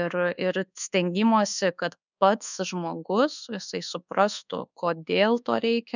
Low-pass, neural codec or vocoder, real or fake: 7.2 kHz; codec, 24 kHz, 1.2 kbps, DualCodec; fake